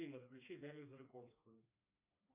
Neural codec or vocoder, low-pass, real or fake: codec, 16 kHz, 2 kbps, FreqCodec, smaller model; 3.6 kHz; fake